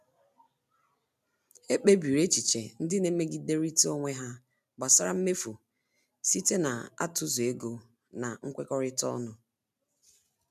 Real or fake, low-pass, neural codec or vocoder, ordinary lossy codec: real; 14.4 kHz; none; none